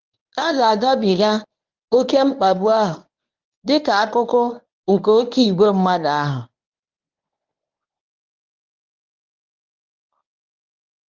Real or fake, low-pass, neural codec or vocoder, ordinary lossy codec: fake; 7.2 kHz; codec, 24 kHz, 0.9 kbps, WavTokenizer, medium speech release version 1; Opus, 24 kbps